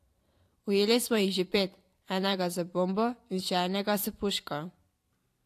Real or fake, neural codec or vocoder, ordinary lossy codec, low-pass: fake; vocoder, 44.1 kHz, 128 mel bands every 512 samples, BigVGAN v2; AAC, 64 kbps; 14.4 kHz